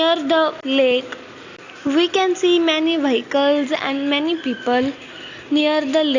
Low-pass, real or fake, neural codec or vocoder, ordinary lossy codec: 7.2 kHz; real; none; AAC, 48 kbps